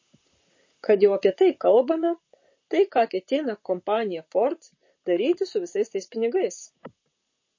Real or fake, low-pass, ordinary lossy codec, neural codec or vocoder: fake; 7.2 kHz; MP3, 32 kbps; vocoder, 44.1 kHz, 128 mel bands, Pupu-Vocoder